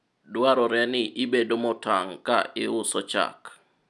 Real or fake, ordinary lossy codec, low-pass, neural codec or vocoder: real; none; none; none